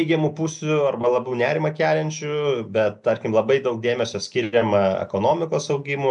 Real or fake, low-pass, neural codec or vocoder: real; 10.8 kHz; none